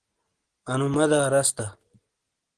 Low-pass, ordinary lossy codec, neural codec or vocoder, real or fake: 9.9 kHz; Opus, 16 kbps; none; real